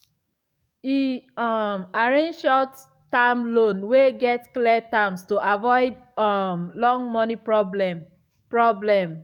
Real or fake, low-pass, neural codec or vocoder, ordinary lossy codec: fake; 19.8 kHz; codec, 44.1 kHz, 7.8 kbps, DAC; none